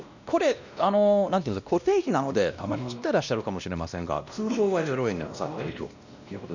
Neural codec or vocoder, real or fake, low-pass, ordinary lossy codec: codec, 16 kHz, 1 kbps, X-Codec, WavLM features, trained on Multilingual LibriSpeech; fake; 7.2 kHz; none